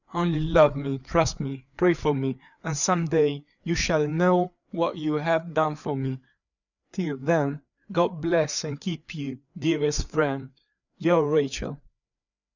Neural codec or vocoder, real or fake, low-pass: codec, 16 kHz, 4 kbps, FreqCodec, larger model; fake; 7.2 kHz